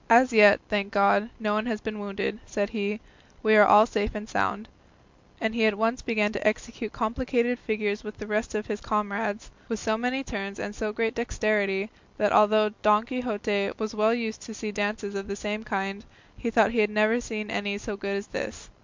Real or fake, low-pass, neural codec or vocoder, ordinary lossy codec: real; 7.2 kHz; none; MP3, 64 kbps